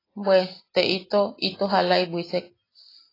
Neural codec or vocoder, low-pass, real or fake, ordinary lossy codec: none; 5.4 kHz; real; AAC, 24 kbps